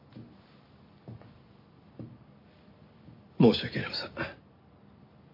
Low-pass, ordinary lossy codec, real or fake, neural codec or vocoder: 5.4 kHz; MP3, 48 kbps; real; none